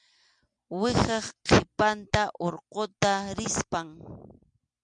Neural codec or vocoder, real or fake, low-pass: none; real; 9.9 kHz